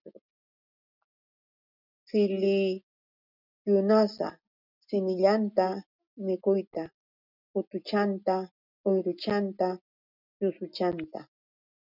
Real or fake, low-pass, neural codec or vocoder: real; 5.4 kHz; none